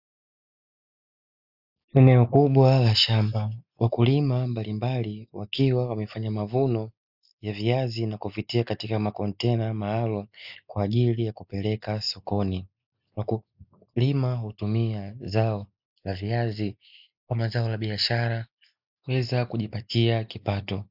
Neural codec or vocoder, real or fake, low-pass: none; real; 5.4 kHz